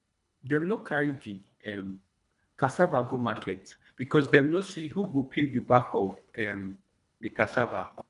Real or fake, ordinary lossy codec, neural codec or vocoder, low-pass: fake; none; codec, 24 kHz, 1.5 kbps, HILCodec; 10.8 kHz